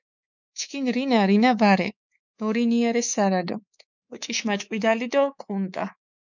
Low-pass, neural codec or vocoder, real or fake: 7.2 kHz; codec, 24 kHz, 3.1 kbps, DualCodec; fake